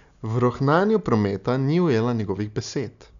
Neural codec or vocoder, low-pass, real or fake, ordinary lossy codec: none; 7.2 kHz; real; none